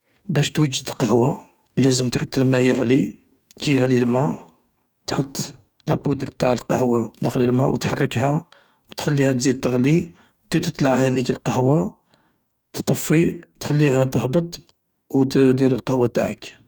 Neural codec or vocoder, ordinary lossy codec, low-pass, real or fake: codec, 44.1 kHz, 2.6 kbps, DAC; none; 19.8 kHz; fake